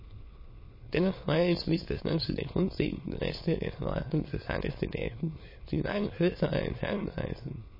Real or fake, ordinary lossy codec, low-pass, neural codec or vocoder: fake; MP3, 24 kbps; 5.4 kHz; autoencoder, 22.05 kHz, a latent of 192 numbers a frame, VITS, trained on many speakers